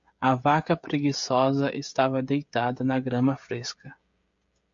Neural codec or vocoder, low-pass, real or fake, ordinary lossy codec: codec, 16 kHz, 16 kbps, FreqCodec, smaller model; 7.2 kHz; fake; MP3, 48 kbps